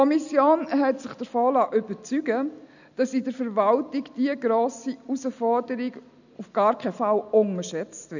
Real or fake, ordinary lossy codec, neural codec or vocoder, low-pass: real; none; none; 7.2 kHz